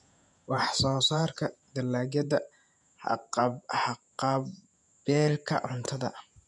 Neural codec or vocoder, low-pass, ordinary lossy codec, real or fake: vocoder, 48 kHz, 128 mel bands, Vocos; 10.8 kHz; none; fake